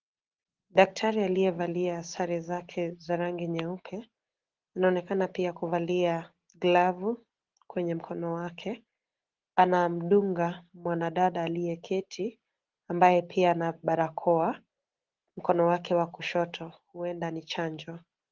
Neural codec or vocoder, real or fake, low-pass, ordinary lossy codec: none; real; 7.2 kHz; Opus, 16 kbps